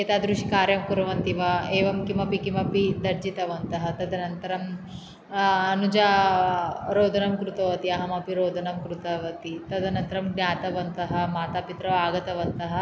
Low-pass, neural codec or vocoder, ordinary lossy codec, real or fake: none; none; none; real